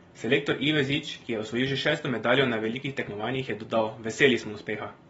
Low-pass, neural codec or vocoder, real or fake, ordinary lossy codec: 9.9 kHz; none; real; AAC, 24 kbps